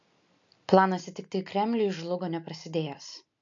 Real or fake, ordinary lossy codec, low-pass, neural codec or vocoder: real; AAC, 64 kbps; 7.2 kHz; none